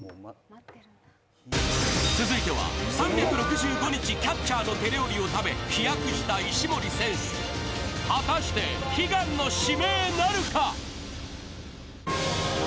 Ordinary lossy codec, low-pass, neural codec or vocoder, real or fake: none; none; none; real